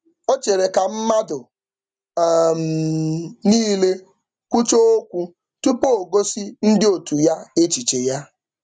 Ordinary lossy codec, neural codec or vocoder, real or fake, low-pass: none; none; real; 9.9 kHz